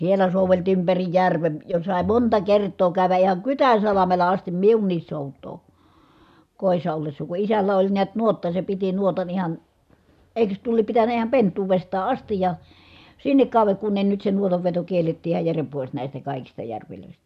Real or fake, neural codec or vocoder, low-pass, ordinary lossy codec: real; none; 14.4 kHz; none